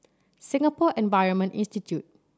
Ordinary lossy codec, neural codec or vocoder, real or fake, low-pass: none; none; real; none